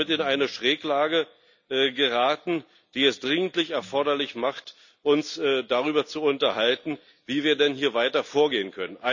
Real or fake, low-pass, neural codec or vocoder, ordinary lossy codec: real; 7.2 kHz; none; none